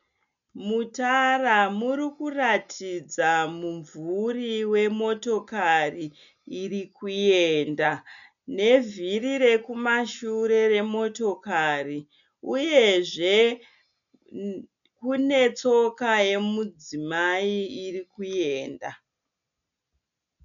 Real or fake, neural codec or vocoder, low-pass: real; none; 7.2 kHz